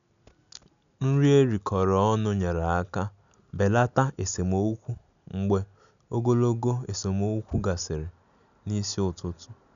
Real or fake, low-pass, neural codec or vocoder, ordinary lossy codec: real; 7.2 kHz; none; none